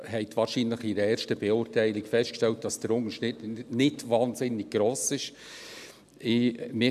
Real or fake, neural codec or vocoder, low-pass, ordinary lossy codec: real; none; 14.4 kHz; none